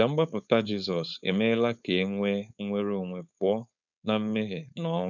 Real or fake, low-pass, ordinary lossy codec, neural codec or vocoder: fake; 7.2 kHz; none; codec, 16 kHz, 4.8 kbps, FACodec